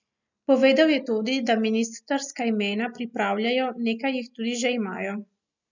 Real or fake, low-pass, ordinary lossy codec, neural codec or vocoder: real; 7.2 kHz; none; none